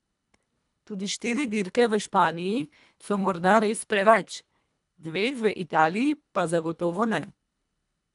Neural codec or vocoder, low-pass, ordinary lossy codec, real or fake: codec, 24 kHz, 1.5 kbps, HILCodec; 10.8 kHz; none; fake